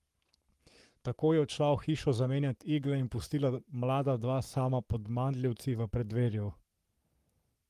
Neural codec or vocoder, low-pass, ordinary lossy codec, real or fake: codec, 44.1 kHz, 7.8 kbps, Pupu-Codec; 14.4 kHz; Opus, 24 kbps; fake